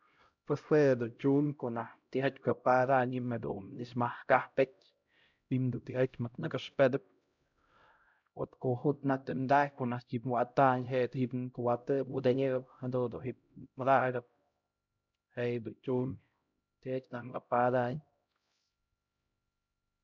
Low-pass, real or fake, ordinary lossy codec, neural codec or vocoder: 7.2 kHz; fake; none; codec, 16 kHz, 0.5 kbps, X-Codec, HuBERT features, trained on LibriSpeech